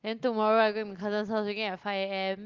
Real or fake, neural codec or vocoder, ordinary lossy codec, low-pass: real; none; Opus, 32 kbps; 7.2 kHz